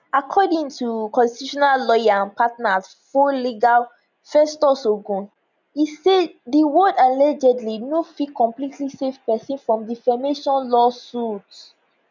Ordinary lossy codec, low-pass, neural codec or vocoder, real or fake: none; 7.2 kHz; none; real